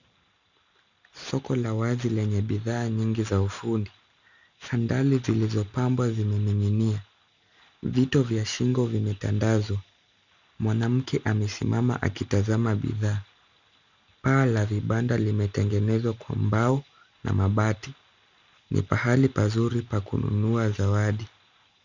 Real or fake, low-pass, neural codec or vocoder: real; 7.2 kHz; none